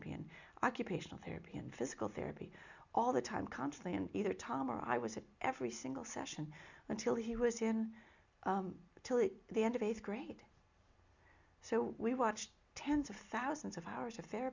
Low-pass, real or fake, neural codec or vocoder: 7.2 kHz; real; none